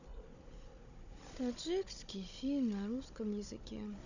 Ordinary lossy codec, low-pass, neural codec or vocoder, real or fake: none; 7.2 kHz; codec, 16 kHz, 16 kbps, FunCodec, trained on Chinese and English, 50 frames a second; fake